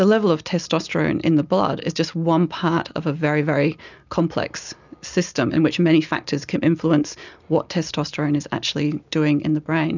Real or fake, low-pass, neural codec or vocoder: real; 7.2 kHz; none